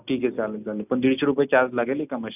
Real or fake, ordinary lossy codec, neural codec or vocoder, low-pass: real; none; none; 3.6 kHz